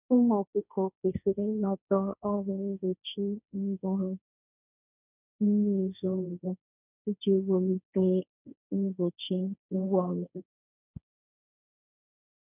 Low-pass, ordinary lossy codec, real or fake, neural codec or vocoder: 3.6 kHz; none; fake; codec, 16 kHz, 1.1 kbps, Voila-Tokenizer